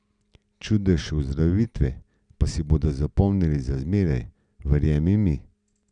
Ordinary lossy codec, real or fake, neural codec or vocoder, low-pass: none; real; none; 9.9 kHz